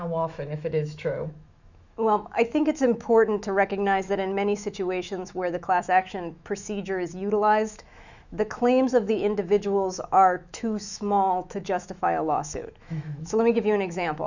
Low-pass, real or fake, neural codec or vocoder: 7.2 kHz; fake; autoencoder, 48 kHz, 128 numbers a frame, DAC-VAE, trained on Japanese speech